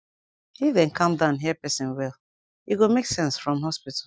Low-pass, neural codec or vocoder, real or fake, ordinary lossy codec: none; none; real; none